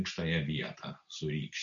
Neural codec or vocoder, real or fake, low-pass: none; real; 7.2 kHz